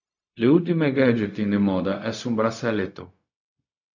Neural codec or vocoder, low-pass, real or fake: codec, 16 kHz, 0.4 kbps, LongCat-Audio-Codec; 7.2 kHz; fake